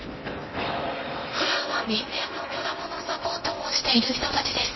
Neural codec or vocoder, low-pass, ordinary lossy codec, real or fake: codec, 16 kHz in and 24 kHz out, 0.6 kbps, FocalCodec, streaming, 4096 codes; 7.2 kHz; MP3, 24 kbps; fake